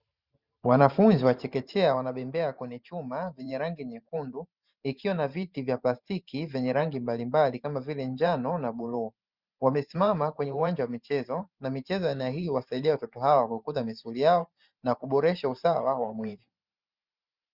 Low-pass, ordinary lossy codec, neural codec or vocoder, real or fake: 5.4 kHz; Opus, 64 kbps; vocoder, 44.1 kHz, 128 mel bands every 512 samples, BigVGAN v2; fake